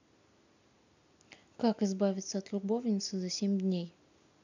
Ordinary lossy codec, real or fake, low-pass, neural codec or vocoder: none; real; 7.2 kHz; none